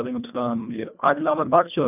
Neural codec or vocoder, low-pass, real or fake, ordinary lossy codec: codec, 24 kHz, 1.5 kbps, HILCodec; 3.6 kHz; fake; none